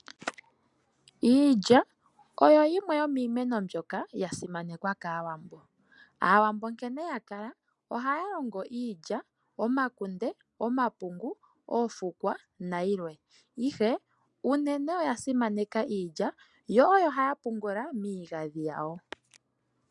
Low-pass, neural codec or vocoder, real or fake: 10.8 kHz; none; real